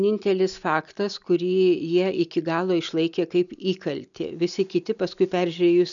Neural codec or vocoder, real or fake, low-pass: none; real; 7.2 kHz